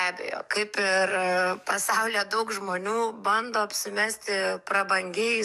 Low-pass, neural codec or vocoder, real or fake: 14.4 kHz; codec, 44.1 kHz, 7.8 kbps, DAC; fake